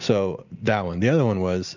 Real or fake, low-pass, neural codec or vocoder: real; 7.2 kHz; none